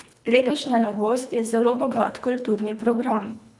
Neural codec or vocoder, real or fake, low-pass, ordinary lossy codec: codec, 24 kHz, 1.5 kbps, HILCodec; fake; none; none